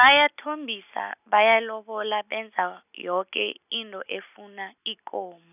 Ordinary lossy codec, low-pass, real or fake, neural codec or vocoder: none; 3.6 kHz; real; none